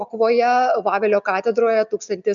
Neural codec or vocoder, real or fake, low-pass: none; real; 7.2 kHz